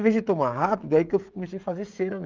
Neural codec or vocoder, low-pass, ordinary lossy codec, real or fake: codec, 16 kHz, 16 kbps, FreqCodec, smaller model; 7.2 kHz; Opus, 24 kbps; fake